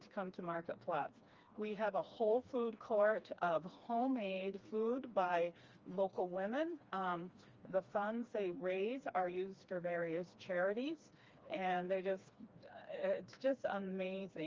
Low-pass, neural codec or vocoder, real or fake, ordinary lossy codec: 7.2 kHz; codec, 16 kHz, 2 kbps, FreqCodec, smaller model; fake; Opus, 32 kbps